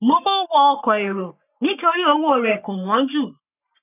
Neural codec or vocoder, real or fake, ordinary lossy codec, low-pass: codec, 44.1 kHz, 3.4 kbps, Pupu-Codec; fake; none; 3.6 kHz